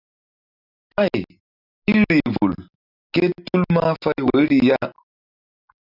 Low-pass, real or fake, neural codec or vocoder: 5.4 kHz; real; none